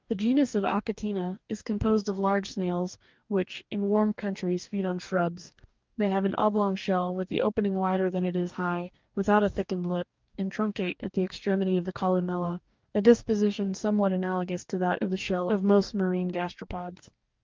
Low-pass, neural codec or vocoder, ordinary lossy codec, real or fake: 7.2 kHz; codec, 44.1 kHz, 2.6 kbps, DAC; Opus, 24 kbps; fake